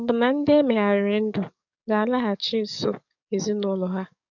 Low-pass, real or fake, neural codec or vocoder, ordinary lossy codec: 7.2 kHz; fake; codec, 44.1 kHz, 7.8 kbps, DAC; none